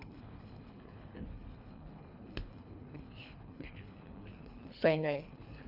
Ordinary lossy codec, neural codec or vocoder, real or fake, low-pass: AAC, 48 kbps; codec, 24 kHz, 3 kbps, HILCodec; fake; 5.4 kHz